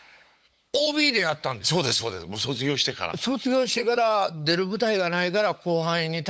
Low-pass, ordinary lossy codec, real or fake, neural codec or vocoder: none; none; fake; codec, 16 kHz, 8 kbps, FunCodec, trained on LibriTTS, 25 frames a second